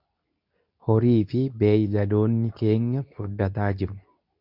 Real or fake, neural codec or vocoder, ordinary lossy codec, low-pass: fake; codec, 24 kHz, 0.9 kbps, WavTokenizer, medium speech release version 2; MP3, 48 kbps; 5.4 kHz